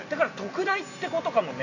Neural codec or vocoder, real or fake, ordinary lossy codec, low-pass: none; real; AAC, 48 kbps; 7.2 kHz